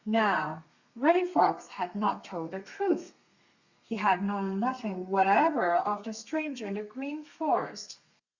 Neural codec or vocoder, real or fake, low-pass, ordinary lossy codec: codec, 32 kHz, 1.9 kbps, SNAC; fake; 7.2 kHz; Opus, 64 kbps